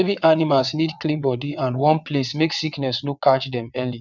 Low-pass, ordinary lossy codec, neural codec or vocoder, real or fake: 7.2 kHz; none; vocoder, 22.05 kHz, 80 mel bands, WaveNeXt; fake